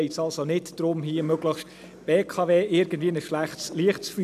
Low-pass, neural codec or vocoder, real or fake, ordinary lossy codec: 14.4 kHz; none; real; none